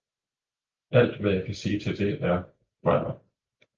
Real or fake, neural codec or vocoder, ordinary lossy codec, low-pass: real; none; Opus, 24 kbps; 7.2 kHz